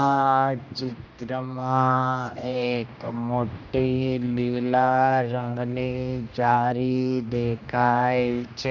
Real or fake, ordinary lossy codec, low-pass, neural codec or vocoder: fake; none; 7.2 kHz; codec, 16 kHz, 1 kbps, X-Codec, HuBERT features, trained on general audio